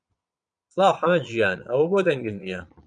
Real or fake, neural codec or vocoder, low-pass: fake; vocoder, 22.05 kHz, 80 mel bands, Vocos; 9.9 kHz